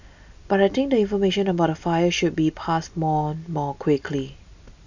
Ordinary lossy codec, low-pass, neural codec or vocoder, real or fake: none; 7.2 kHz; none; real